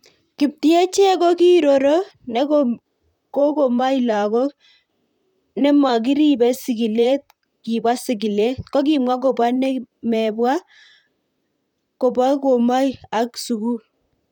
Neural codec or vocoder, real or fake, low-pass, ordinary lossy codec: vocoder, 44.1 kHz, 128 mel bands, Pupu-Vocoder; fake; 19.8 kHz; none